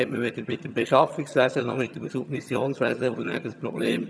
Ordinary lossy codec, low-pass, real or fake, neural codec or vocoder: none; none; fake; vocoder, 22.05 kHz, 80 mel bands, HiFi-GAN